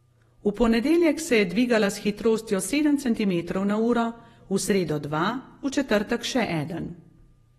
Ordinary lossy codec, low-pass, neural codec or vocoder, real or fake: AAC, 32 kbps; 19.8 kHz; vocoder, 48 kHz, 128 mel bands, Vocos; fake